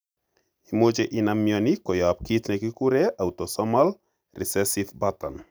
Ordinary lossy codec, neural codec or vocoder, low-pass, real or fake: none; none; none; real